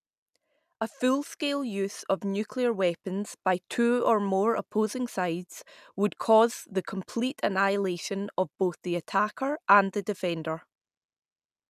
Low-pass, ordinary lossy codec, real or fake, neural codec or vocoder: 14.4 kHz; none; real; none